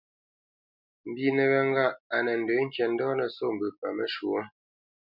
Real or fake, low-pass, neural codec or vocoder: real; 5.4 kHz; none